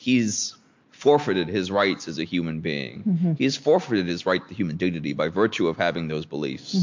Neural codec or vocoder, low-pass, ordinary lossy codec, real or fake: none; 7.2 kHz; MP3, 48 kbps; real